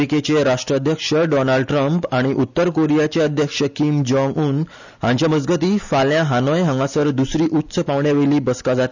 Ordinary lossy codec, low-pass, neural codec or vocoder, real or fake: none; 7.2 kHz; none; real